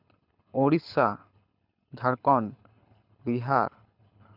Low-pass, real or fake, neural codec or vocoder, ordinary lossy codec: 5.4 kHz; fake; codec, 24 kHz, 6 kbps, HILCodec; none